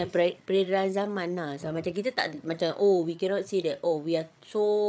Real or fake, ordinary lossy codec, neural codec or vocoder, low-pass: fake; none; codec, 16 kHz, 8 kbps, FreqCodec, larger model; none